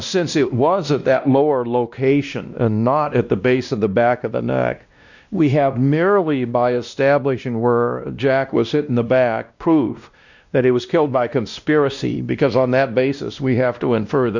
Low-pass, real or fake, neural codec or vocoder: 7.2 kHz; fake; codec, 16 kHz, 1 kbps, X-Codec, WavLM features, trained on Multilingual LibriSpeech